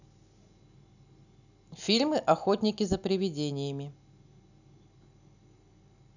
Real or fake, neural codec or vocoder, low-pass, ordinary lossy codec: real; none; 7.2 kHz; none